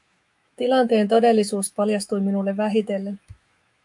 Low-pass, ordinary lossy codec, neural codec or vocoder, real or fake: 10.8 kHz; MP3, 64 kbps; autoencoder, 48 kHz, 128 numbers a frame, DAC-VAE, trained on Japanese speech; fake